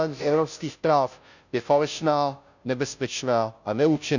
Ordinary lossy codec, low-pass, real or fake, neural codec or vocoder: none; 7.2 kHz; fake; codec, 16 kHz, 0.5 kbps, FunCodec, trained on Chinese and English, 25 frames a second